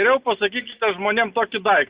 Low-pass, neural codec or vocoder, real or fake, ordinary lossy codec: 3.6 kHz; none; real; Opus, 64 kbps